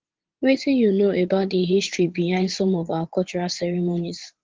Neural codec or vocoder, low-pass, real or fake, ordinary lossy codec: vocoder, 44.1 kHz, 80 mel bands, Vocos; 7.2 kHz; fake; Opus, 16 kbps